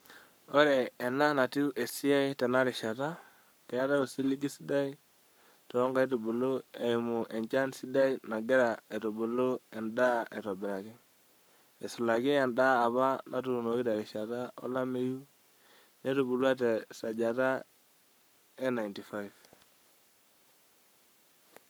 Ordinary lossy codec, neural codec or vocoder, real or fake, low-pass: none; codec, 44.1 kHz, 7.8 kbps, Pupu-Codec; fake; none